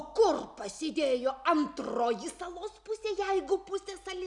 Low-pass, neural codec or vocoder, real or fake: 10.8 kHz; none; real